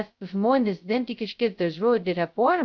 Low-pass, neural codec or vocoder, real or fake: 7.2 kHz; codec, 16 kHz, 0.2 kbps, FocalCodec; fake